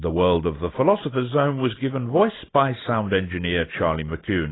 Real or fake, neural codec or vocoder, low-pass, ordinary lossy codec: real; none; 7.2 kHz; AAC, 16 kbps